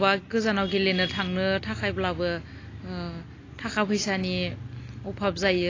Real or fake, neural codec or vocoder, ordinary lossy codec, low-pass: real; none; AAC, 32 kbps; 7.2 kHz